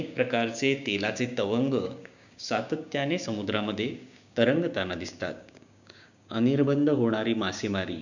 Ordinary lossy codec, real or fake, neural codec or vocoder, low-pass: none; fake; codec, 16 kHz, 6 kbps, DAC; 7.2 kHz